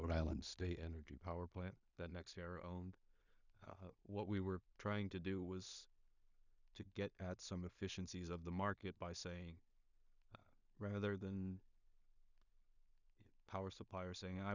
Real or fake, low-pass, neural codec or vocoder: fake; 7.2 kHz; codec, 16 kHz in and 24 kHz out, 0.4 kbps, LongCat-Audio-Codec, two codebook decoder